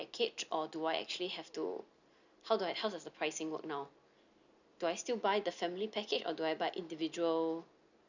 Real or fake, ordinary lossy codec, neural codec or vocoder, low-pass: real; none; none; 7.2 kHz